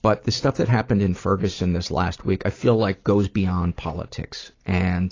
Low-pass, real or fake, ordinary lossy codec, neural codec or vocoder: 7.2 kHz; fake; AAC, 32 kbps; vocoder, 44.1 kHz, 128 mel bands every 256 samples, BigVGAN v2